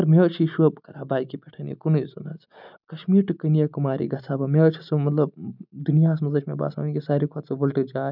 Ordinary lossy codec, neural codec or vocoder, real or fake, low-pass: none; none; real; 5.4 kHz